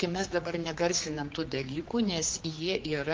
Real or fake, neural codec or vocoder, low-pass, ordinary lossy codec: fake; codec, 16 kHz, 4 kbps, X-Codec, HuBERT features, trained on general audio; 7.2 kHz; Opus, 32 kbps